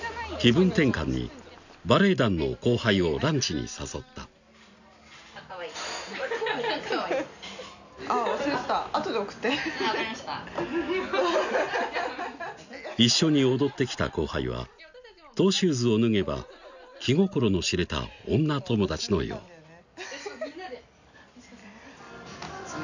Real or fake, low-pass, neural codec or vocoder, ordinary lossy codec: real; 7.2 kHz; none; none